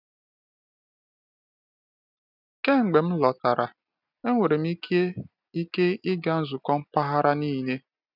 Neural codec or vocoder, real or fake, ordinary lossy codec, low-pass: none; real; none; 5.4 kHz